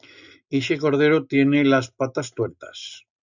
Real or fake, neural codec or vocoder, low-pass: real; none; 7.2 kHz